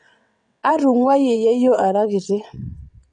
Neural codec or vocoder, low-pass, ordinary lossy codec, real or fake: vocoder, 22.05 kHz, 80 mel bands, WaveNeXt; 9.9 kHz; none; fake